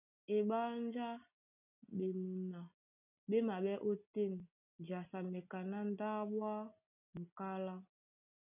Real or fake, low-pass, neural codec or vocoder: real; 3.6 kHz; none